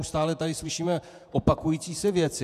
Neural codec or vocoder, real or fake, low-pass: vocoder, 48 kHz, 128 mel bands, Vocos; fake; 14.4 kHz